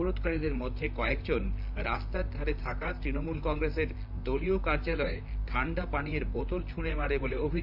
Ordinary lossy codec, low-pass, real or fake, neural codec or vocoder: AAC, 48 kbps; 5.4 kHz; fake; vocoder, 44.1 kHz, 128 mel bands, Pupu-Vocoder